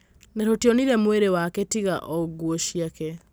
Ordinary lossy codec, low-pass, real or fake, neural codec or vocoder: none; none; real; none